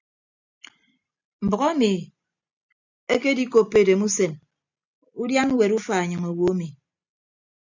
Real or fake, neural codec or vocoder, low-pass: real; none; 7.2 kHz